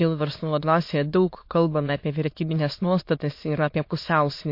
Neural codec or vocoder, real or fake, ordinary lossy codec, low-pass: autoencoder, 22.05 kHz, a latent of 192 numbers a frame, VITS, trained on many speakers; fake; MP3, 32 kbps; 5.4 kHz